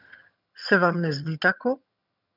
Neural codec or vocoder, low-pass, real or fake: vocoder, 22.05 kHz, 80 mel bands, HiFi-GAN; 5.4 kHz; fake